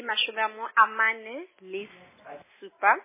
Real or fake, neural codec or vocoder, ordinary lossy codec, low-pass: real; none; MP3, 16 kbps; 3.6 kHz